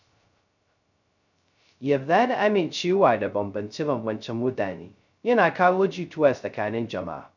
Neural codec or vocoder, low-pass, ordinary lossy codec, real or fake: codec, 16 kHz, 0.2 kbps, FocalCodec; 7.2 kHz; none; fake